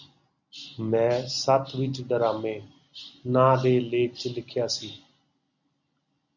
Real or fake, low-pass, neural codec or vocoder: real; 7.2 kHz; none